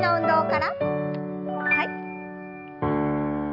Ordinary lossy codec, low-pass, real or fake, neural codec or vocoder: AAC, 48 kbps; 5.4 kHz; real; none